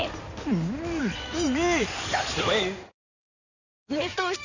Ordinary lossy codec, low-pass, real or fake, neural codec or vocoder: none; 7.2 kHz; fake; codec, 16 kHz in and 24 kHz out, 2.2 kbps, FireRedTTS-2 codec